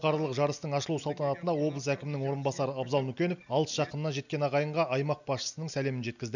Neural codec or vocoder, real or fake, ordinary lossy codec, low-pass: none; real; none; 7.2 kHz